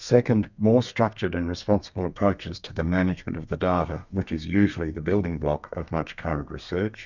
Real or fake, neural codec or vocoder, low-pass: fake; codec, 32 kHz, 1.9 kbps, SNAC; 7.2 kHz